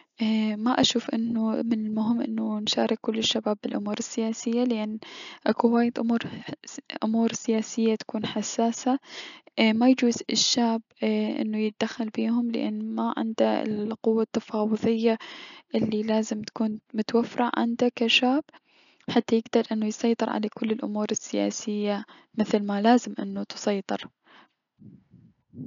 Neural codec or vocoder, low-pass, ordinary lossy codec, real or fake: none; 7.2 kHz; none; real